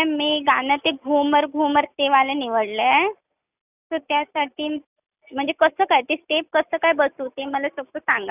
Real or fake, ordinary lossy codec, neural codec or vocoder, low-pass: real; none; none; 3.6 kHz